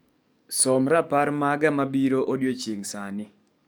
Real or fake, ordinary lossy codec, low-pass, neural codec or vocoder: fake; none; none; codec, 44.1 kHz, 7.8 kbps, DAC